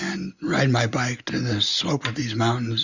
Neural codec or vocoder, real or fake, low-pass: none; real; 7.2 kHz